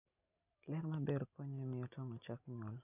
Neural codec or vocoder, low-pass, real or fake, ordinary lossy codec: none; 3.6 kHz; real; none